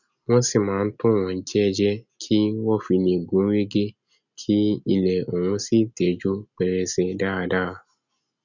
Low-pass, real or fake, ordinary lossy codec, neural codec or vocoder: 7.2 kHz; real; none; none